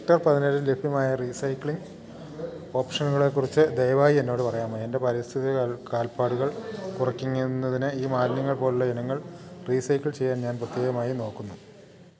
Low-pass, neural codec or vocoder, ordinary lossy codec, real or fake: none; none; none; real